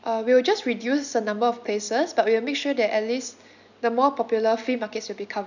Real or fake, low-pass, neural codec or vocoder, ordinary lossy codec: real; 7.2 kHz; none; none